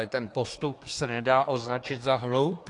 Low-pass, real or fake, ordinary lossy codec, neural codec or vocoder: 10.8 kHz; fake; AAC, 48 kbps; codec, 24 kHz, 1 kbps, SNAC